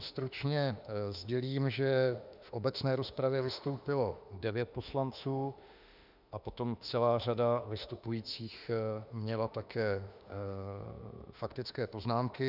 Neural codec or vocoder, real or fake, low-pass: autoencoder, 48 kHz, 32 numbers a frame, DAC-VAE, trained on Japanese speech; fake; 5.4 kHz